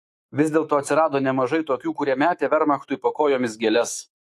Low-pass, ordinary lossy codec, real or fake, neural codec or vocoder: 14.4 kHz; AAC, 64 kbps; fake; autoencoder, 48 kHz, 128 numbers a frame, DAC-VAE, trained on Japanese speech